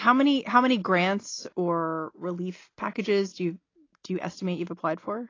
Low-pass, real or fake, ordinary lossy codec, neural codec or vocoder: 7.2 kHz; real; AAC, 32 kbps; none